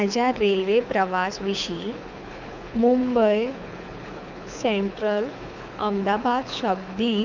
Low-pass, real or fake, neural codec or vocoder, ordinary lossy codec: 7.2 kHz; fake; codec, 24 kHz, 6 kbps, HILCodec; none